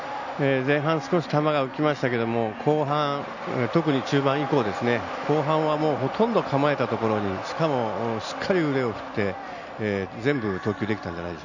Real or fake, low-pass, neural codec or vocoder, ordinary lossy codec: real; 7.2 kHz; none; none